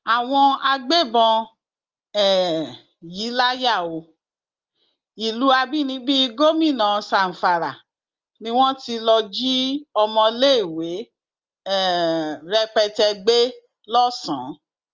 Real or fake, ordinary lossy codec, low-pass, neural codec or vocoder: real; Opus, 32 kbps; 7.2 kHz; none